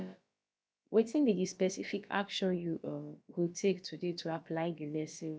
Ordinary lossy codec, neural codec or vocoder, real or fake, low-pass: none; codec, 16 kHz, about 1 kbps, DyCAST, with the encoder's durations; fake; none